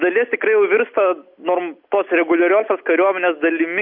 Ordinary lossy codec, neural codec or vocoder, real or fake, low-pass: MP3, 48 kbps; none; real; 5.4 kHz